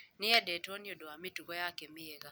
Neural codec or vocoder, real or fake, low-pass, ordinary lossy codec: none; real; none; none